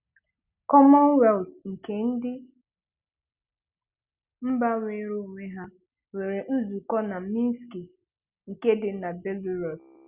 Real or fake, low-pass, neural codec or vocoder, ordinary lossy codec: real; 3.6 kHz; none; Opus, 64 kbps